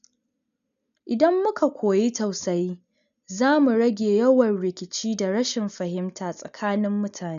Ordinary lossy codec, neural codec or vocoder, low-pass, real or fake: none; none; 7.2 kHz; real